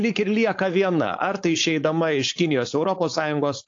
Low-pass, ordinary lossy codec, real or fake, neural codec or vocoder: 7.2 kHz; AAC, 48 kbps; fake; codec, 16 kHz, 4.8 kbps, FACodec